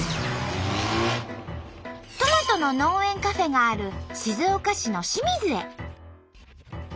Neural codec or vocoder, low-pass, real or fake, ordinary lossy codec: none; none; real; none